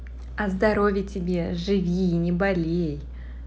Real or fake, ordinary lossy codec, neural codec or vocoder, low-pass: real; none; none; none